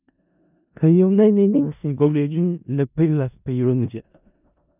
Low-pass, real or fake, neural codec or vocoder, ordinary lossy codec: 3.6 kHz; fake; codec, 16 kHz in and 24 kHz out, 0.4 kbps, LongCat-Audio-Codec, four codebook decoder; none